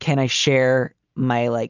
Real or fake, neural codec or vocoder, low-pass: real; none; 7.2 kHz